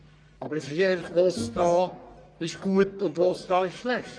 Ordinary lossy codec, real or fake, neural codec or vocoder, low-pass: MP3, 96 kbps; fake; codec, 44.1 kHz, 1.7 kbps, Pupu-Codec; 9.9 kHz